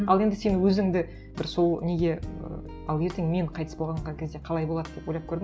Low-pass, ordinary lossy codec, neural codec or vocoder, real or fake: none; none; none; real